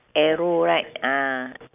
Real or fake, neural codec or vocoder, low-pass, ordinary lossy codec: real; none; 3.6 kHz; none